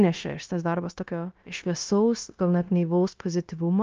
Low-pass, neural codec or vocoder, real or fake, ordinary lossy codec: 7.2 kHz; codec, 16 kHz, 0.9 kbps, LongCat-Audio-Codec; fake; Opus, 24 kbps